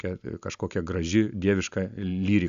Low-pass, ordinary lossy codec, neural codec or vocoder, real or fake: 7.2 kHz; AAC, 96 kbps; none; real